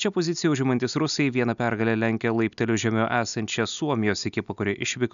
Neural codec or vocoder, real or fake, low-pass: none; real; 7.2 kHz